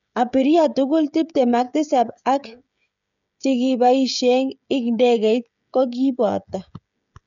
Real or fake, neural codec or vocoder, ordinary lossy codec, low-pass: fake; codec, 16 kHz, 16 kbps, FreqCodec, smaller model; none; 7.2 kHz